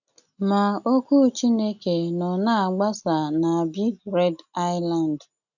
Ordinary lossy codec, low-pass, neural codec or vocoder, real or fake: none; 7.2 kHz; none; real